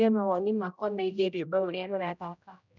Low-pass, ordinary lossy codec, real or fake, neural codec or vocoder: 7.2 kHz; none; fake; codec, 16 kHz, 0.5 kbps, X-Codec, HuBERT features, trained on general audio